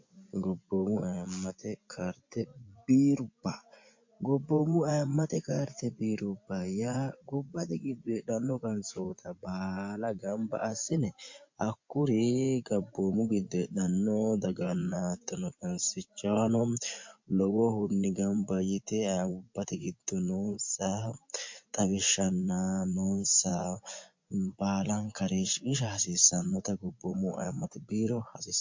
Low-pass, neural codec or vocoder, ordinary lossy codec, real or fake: 7.2 kHz; vocoder, 24 kHz, 100 mel bands, Vocos; MP3, 48 kbps; fake